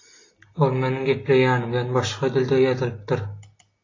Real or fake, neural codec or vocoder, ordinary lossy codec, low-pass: real; none; AAC, 32 kbps; 7.2 kHz